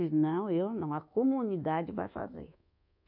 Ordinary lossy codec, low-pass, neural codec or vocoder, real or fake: none; 5.4 kHz; codec, 24 kHz, 1.2 kbps, DualCodec; fake